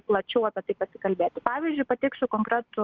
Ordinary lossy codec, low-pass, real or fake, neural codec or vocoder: Opus, 24 kbps; 7.2 kHz; real; none